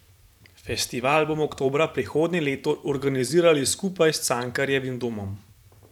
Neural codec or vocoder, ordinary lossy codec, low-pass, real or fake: vocoder, 44.1 kHz, 128 mel bands every 512 samples, BigVGAN v2; none; 19.8 kHz; fake